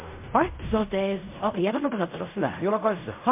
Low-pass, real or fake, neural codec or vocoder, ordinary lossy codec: 3.6 kHz; fake; codec, 16 kHz in and 24 kHz out, 0.4 kbps, LongCat-Audio-Codec, fine tuned four codebook decoder; MP3, 32 kbps